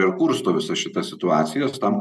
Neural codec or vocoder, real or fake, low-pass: none; real; 14.4 kHz